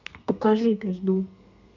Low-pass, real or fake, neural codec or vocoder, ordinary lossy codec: 7.2 kHz; fake; codec, 32 kHz, 1.9 kbps, SNAC; none